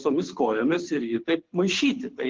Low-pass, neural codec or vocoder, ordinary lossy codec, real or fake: 7.2 kHz; codec, 24 kHz, 6 kbps, HILCodec; Opus, 16 kbps; fake